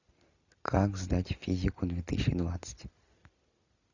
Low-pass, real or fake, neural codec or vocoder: 7.2 kHz; real; none